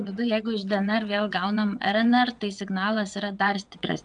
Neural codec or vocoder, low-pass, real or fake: vocoder, 22.05 kHz, 80 mel bands, WaveNeXt; 9.9 kHz; fake